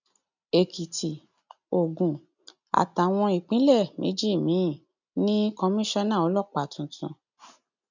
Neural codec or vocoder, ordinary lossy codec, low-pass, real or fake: none; none; 7.2 kHz; real